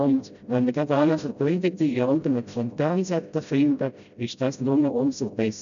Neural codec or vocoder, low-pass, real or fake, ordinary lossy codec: codec, 16 kHz, 0.5 kbps, FreqCodec, smaller model; 7.2 kHz; fake; none